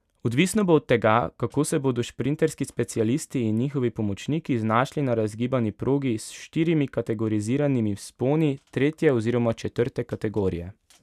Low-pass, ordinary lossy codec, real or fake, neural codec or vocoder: 14.4 kHz; none; real; none